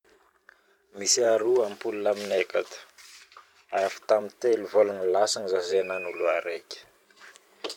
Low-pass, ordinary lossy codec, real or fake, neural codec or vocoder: 19.8 kHz; none; fake; vocoder, 44.1 kHz, 128 mel bands every 512 samples, BigVGAN v2